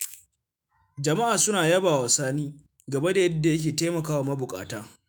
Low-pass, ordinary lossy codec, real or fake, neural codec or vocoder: none; none; fake; autoencoder, 48 kHz, 128 numbers a frame, DAC-VAE, trained on Japanese speech